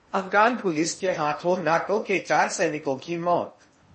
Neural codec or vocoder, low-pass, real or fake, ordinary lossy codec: codec, 16 kHz in and 24 kHz out, 0.8 kbps, FocalCodec, streaming, 65536 codes; 10.8 kHz; fake; MP3, 32 kbps